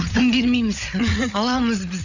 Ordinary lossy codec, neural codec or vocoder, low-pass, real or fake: Opus, 64 kbps; codec, 16 kHz, 16 kbps, FreqCodec, larger model; 7.2 kHz; fake